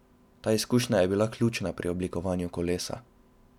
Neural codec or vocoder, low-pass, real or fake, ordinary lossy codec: none; 19.8 kHz; real; none